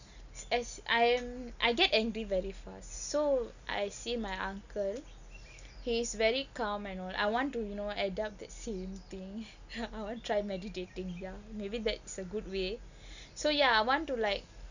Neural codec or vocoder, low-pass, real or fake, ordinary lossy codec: none; 7.2 kHz; real; none